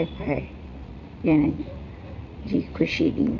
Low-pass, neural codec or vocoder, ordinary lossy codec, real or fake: 7.2 kHz; vocoder, 44.1 kHz, 80 mel bands, Vocos; none; fake